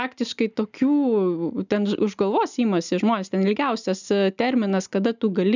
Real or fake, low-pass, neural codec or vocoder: real; 7.2 kHz; none